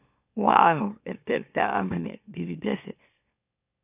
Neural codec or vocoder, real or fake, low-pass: autoencoder, 44.1 kHz, a latent of 192 numbers a frame, MeloTTS; fake; 3.6 kHz